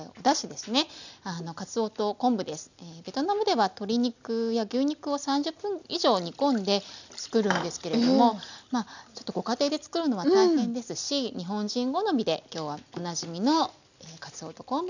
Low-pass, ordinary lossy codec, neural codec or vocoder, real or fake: 7.2 kHz; none; none; real